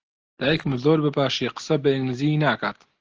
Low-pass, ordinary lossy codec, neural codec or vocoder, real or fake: 7.2 kHz; Opus, 16 kbps; none; real